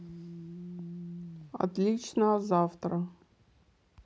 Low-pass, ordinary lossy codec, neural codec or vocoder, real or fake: none; none; none; real